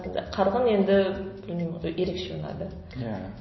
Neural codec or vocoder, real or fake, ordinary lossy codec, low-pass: none; real; MP3, 24 kbps; 7.2 kHz